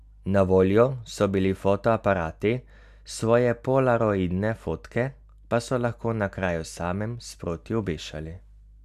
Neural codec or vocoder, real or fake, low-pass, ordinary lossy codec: none; real; 14.4 kHz; none